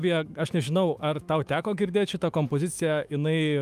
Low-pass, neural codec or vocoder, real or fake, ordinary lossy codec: 14.4 kHz; autoencoder, 48 kHz, 128 numbers a frame, DAC-VAE, trained on Japanese speech; fake; Opus, 32 kbps